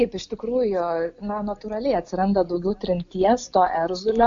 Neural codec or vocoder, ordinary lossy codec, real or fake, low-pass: none; MP3, 48 kbps; real; 7.2 kHz